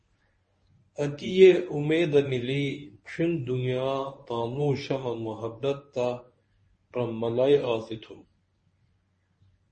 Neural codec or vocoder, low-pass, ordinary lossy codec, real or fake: codec, 24 kHz, 0.9 kbps, WavTokenizer, medium speech release version 1; 10.8 kHz; MP3, 32 kbps; fake